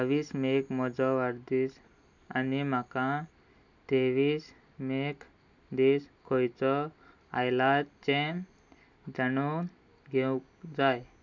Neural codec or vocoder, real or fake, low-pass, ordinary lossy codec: none; real; 7.2 kHz; none